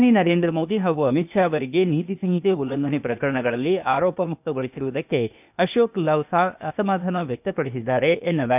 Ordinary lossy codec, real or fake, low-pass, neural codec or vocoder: none; fake; 3.6 kHz; codec, 16 kHz, 0.8 kbps, ZipCodec